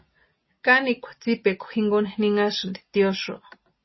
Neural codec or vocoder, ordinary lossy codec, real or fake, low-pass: none; MP3, 24 kbps; real; 7.2 kHz